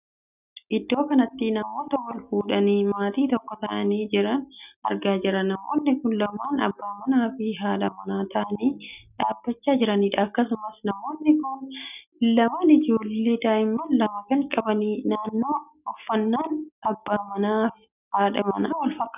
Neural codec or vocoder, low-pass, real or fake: none; 3.6 kHz; real